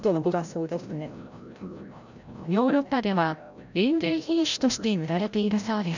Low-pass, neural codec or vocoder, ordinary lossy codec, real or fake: 7.2 kHz; codec, 16 kHz, 0.5 kbps, FreqCodec, larger model; none; fake